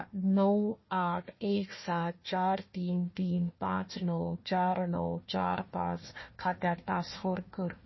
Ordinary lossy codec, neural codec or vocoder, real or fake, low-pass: MP3, 24 kbps; codec, 16 kHz, 1 kbps, FunCodec, trained on Chinese and English, 50 frames a second; fake; 7.2 kHz